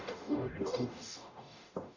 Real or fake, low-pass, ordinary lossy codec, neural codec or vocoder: fake; 7.2 kHz; Opus, 64 kbps; codec, 44.1 kHz, 0.9 kbps, DAC